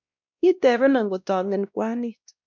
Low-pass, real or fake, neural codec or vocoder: 7.2 kHz; fake; codec, 16 kHz, 1 kbps, X-Codec, WavLM features, trained on Multilingual LibriSpeech